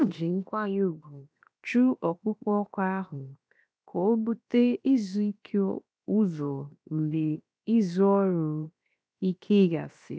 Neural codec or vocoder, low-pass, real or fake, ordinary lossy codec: codec, 16 kHz, 0.7 kbps, FocalCodec; none; fake; none